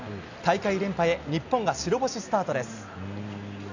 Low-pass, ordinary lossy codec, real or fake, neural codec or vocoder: 7.2 kHz; none; real; none